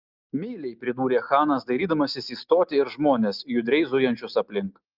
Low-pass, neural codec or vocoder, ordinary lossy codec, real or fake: 5.4 kHz; none; Opus, 32 kbps; real